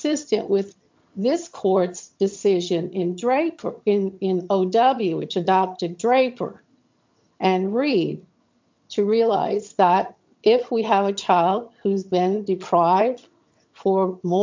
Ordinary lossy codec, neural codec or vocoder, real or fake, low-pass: MP3, 48 kbps; vocoder, 22.05 kHz, 80 mel bands, HiFi-GAN; fake; 7.2 kHz